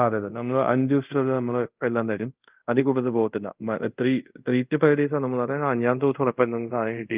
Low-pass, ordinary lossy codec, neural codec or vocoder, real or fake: 3.6 kHz; Opus, 24 kbps; codec, 24 kHz, 0.5 kbps, DualCodec; fake